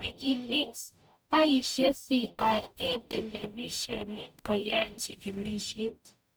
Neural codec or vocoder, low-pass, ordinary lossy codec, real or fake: codec, 44.1 kHz, 0.9 kbps, DAC; none; none; fake